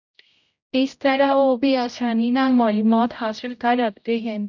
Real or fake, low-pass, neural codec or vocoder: fake; 7.2 kHz; codec, 16 kHz, 0.5 kbps, X-Codec, HuBERT features, trained on general audio